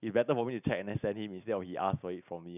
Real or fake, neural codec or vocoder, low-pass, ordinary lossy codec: real; none; 3.6 kHz; none